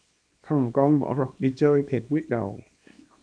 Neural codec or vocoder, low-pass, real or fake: codec, 24 kHz, 0.9 kbps, WavTokenizer, small release; 9.9 kHz; fake